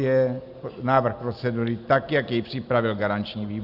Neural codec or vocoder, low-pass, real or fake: none; 5.4 kHz; real